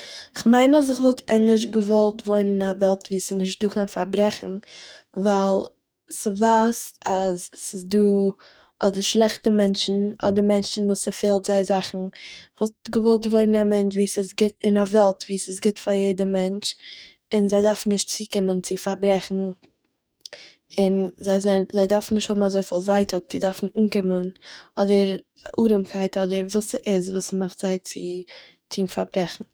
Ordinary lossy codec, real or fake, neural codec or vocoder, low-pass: none; fake; codec, 44.1 kHz, 2.6 kbps, DAC; none